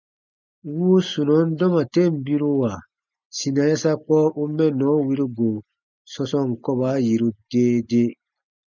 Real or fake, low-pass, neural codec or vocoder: real; 7.2 kHz; none